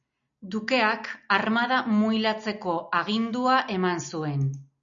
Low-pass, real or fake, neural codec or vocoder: 7.2 kHz; real; none